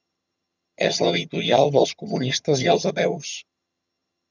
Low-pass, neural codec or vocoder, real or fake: 7.2 kHz; vocoder, 22.05 kHz, 80 mel bands, HiFi-GAN; fake